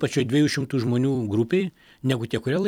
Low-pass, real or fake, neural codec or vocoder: 19.8 kHz; fake; vocoder, 44.1 kHz, 128 mel bands every 256 samples, BigVGAN v2